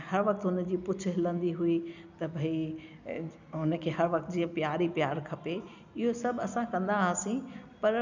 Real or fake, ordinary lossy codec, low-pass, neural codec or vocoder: real; none; 7.2 kHz; none